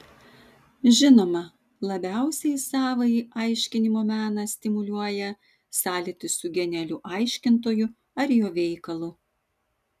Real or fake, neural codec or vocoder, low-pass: real; none; 14.4 kHz